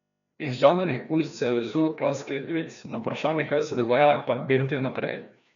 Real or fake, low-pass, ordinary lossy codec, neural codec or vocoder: fake; 7.2 kHz; none; codec, 16 kHz, 1 kbps, FreqCodec, larger model